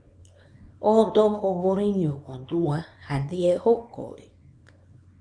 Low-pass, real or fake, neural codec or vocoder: 9.9 kHz; fake; codec, 24 kHz, 0.9 kbps, WavTokenizer, small release